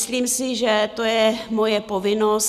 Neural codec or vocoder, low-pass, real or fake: none; 14.4 kHz; real